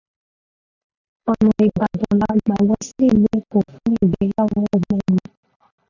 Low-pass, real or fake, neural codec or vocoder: 7.2 kHz; real; none